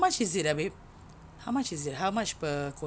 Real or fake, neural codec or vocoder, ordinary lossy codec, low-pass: real; none; none; none